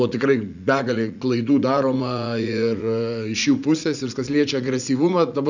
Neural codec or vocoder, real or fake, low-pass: vocoder, 44.1 kHz, 80 mel bands, Vocos; fake; 7.2 kHz